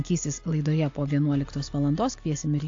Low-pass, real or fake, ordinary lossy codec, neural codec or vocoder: 7.2 kHz; real; AAC, 48 kbps; none